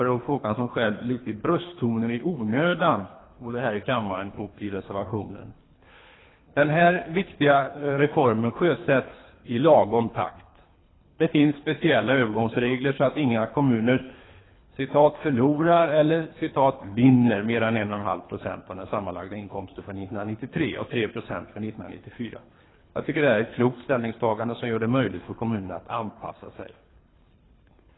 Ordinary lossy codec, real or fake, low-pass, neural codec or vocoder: AAC, 16 kbps; fake; 7.2 kHz; codec, 24 kHz, 3 kbps, HILCodec